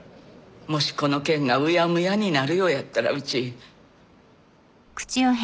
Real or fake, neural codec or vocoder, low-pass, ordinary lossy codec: real; none; none; none